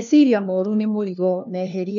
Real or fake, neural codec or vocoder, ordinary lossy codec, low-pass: fake; codec, 16 kHz, 1 kbps, FunCodec, trained on LibriTTS, 50 frames a second; none; 7.2 kHz